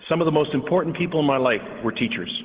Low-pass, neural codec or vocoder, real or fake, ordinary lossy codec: 3.6 kHz; none; real; Opus, 16 kbps